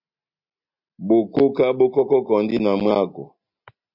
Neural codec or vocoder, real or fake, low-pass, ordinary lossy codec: none; real; 5.4 kHz; MP3, 48 kbps